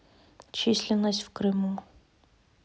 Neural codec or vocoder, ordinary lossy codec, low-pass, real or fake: none; none; none; real